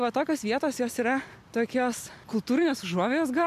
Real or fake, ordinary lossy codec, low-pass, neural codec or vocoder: real; AAC, 96 kbps; 14.4 kHz; none